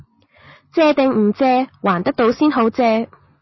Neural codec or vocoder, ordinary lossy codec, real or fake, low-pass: none; MP3, 24 kbps; real; 7.2 kHz